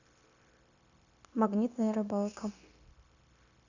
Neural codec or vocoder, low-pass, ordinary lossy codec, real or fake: codec, 16 kHz, 0.9 kbps, LongCat-Audio-Codec; 7.2 kHz; Opus, 64 kbps; fake